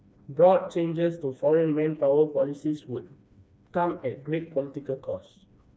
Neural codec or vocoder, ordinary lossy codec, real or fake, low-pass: codec, 16 kHz, 2 kbps, FreqCodec, smaller model; none; fake; none